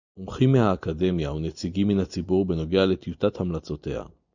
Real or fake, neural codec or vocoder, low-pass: real; none; 7.2 kHz